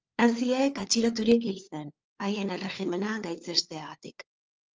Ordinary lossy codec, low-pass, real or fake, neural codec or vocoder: Opus, 24 kbps; 7.2 kHz; fake; codec, 16 kHz, 4 kbps, FunCodec, trained on LibriTTS, 50 frames a second